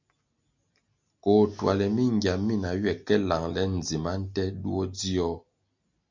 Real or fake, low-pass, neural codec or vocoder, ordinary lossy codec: real; 7.2 kHz; none; MP3, 48 kbps